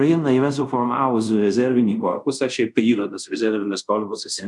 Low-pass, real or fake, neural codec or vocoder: 10.8 kHz; fake; codec, 24 kHz, 0.5 kbps, DualCodec